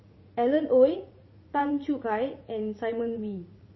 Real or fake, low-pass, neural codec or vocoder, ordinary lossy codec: fake; 7.2 kHz; vocoder, 44.1 kHz, 80 mel bands, Vocos; MP3, 24 kbps